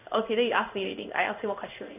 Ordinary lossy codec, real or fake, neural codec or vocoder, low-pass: none; fake; codec, 16 kHz in and 24 kHz out, 1 kbps, XY-Tokenizer; 3.6 kHz